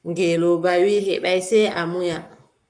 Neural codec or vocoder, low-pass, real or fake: codec, 44.1 kHz, 7.8 kbps, Pupu-Codec; 9.9 kHz; fake